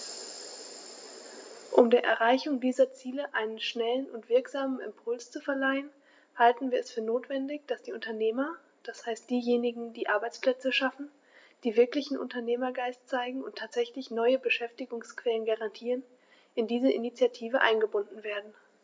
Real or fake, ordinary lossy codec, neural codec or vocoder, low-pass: real; none; none; none